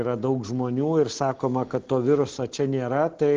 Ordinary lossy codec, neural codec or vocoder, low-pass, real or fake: Opus, 16 kbps; none; 7.2 kHz; real